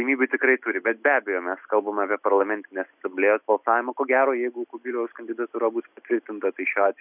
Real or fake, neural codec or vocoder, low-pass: real; none; 3.6 kHz